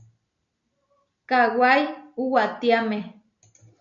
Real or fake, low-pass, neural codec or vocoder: real; 7.2 kHz; none